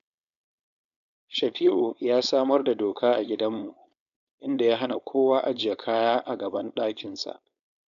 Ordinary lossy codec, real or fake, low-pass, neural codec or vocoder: none; fake; 7.2 kHz; codec, 16 kHz, 4.8 kbps, FACodec